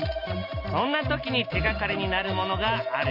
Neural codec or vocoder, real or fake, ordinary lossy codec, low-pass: none; real; none; 5.4 kHz